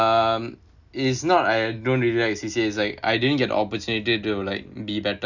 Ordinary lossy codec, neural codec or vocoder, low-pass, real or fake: none; none; 7.2 kHz; real